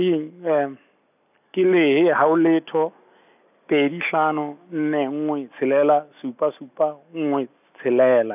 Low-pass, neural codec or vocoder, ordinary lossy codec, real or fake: 3.6 kHz; none; none; real